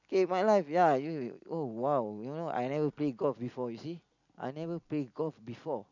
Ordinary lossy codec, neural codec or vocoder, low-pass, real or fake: none; none; 7.2 kHz; real